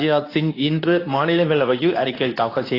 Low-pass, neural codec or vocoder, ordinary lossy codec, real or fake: 5.4 kHz; codec, 24 kHz, 0.9 kbps, WavTokenizer, medium speech release version 2; AAC, 24 kbps; fake